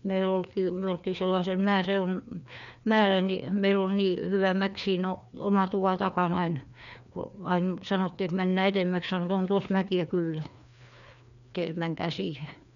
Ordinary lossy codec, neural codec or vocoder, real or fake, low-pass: none; codec, 16 kHz, 2 kbps, FreqCodec, larger model; fake; 7.2 kHz